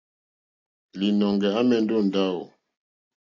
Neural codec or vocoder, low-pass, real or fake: none; 7.2 kHz; real